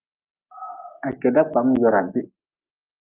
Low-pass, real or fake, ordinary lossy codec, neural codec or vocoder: 3.6 kHz; real; Opus, 24 kbps; none